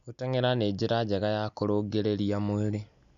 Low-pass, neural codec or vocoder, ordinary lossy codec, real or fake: 7.2 kHz; none; none; real